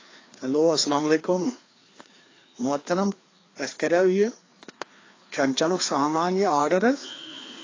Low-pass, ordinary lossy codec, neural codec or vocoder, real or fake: 7.2 kHz; AAC, 32 kbps; codec, 16 kHz, 2 kbps, FreqCodec, larger model; fake